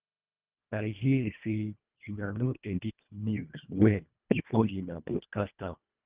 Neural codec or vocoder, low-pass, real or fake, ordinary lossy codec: codec, 24 kHz, 1.5 kbps, HILCodec; 3.6 kHz; fake; Opus, 24 kbps